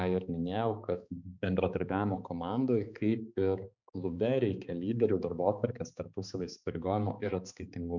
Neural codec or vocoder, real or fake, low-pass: codec, 16 kHz, 4 kbps, X-Codec, HuBERT features, trained on balanced general audio; fake; 7.2 kHz